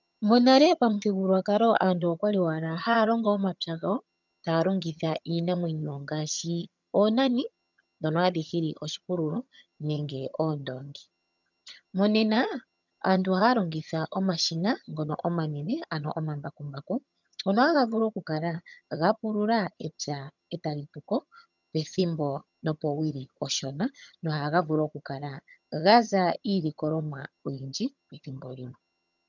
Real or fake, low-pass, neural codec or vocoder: fake; 7.2 kHz; vocoder, 22.05 kHz, 80 mel bands, HiFi-GAN